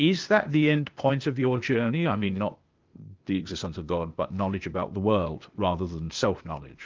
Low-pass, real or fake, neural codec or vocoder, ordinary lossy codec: 7.2 kHz; fake; codec, 16 kHz, 0.8 kbps, ZipCodec; Opus, 24 kbps